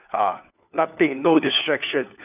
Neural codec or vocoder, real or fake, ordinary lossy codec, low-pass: codec, 16 kHz, 4 kbps, FunCodec, trained on LibriTTS, 50 frames a second; fake; none; 3.6 kHz